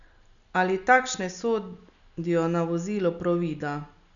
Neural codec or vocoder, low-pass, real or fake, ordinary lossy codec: none; 7.2 kHz; real; none